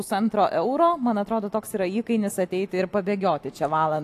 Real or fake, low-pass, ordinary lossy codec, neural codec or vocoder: real; 14.4 kHz; AAC, 64 kbps; none